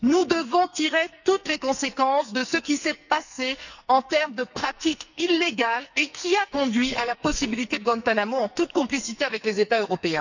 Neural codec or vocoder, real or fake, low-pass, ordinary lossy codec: codec, 44.1 kHz, 2.6 kbps, SNAC; fake; 7.2 kHz; none